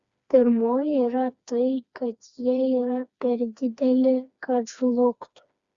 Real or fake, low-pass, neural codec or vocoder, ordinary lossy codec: fake; 7.2 kHz; codec, 16 kHz, 2 kbps, FreqCodec, smaller model; Opus, 64 kbps